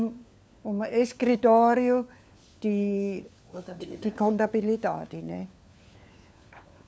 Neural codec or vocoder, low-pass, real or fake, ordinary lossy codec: codec, 16 kHz, 4 kbps, FunCodec, trained on LibriTTS, 50 frames a second; none; fake; none